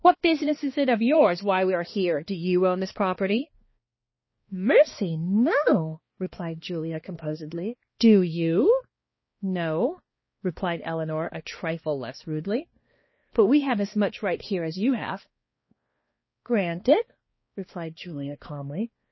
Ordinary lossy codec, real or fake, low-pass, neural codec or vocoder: MP3, 24 kbps; fake; 7.2 kHz; codec, 16 kHz, 2 kbps, X-Codec, HuBERT features, trained on balanced general audio